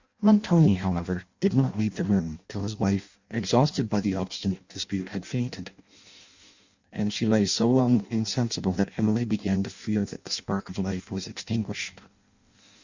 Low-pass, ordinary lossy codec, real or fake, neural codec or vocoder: 7.2 kHz; Opus, 64 kbps; fake; codec, 16 kHz in and 24 kHz out, 0.6 kbps, FireRedTTS-2 codec